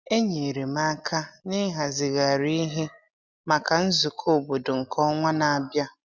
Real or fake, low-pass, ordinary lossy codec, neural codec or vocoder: real; none; none; none